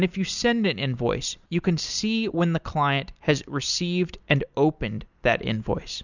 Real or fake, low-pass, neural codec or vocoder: real; 7.2 kHz; none